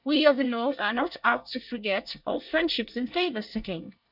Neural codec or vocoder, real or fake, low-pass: codec, 24 kHz, 1 kbps, SNAC; fake; 5.4 kHz